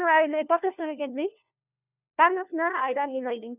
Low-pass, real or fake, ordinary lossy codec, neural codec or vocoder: 3.6 kHz; fake; none; codec, 16 kHz, 1 kbps, FunCodec, trained on LibriTTS, 50 frames a second